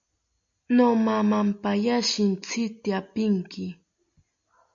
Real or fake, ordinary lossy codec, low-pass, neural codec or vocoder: real; MP3, 96 kbps; 7.2 kHz; none